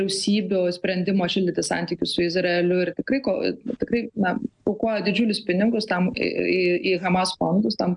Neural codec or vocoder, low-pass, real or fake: none; 9.9 kHz; real